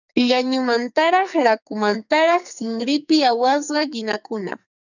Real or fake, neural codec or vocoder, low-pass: fake; codec, 44.1 kHz, 2.6 kbps, SNAC; 7.2 kHz